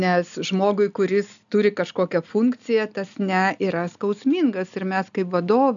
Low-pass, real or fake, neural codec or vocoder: 7.2 kHz; real; none